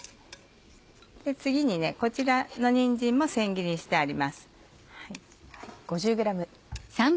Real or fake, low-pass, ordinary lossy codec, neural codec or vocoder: real; none; none; none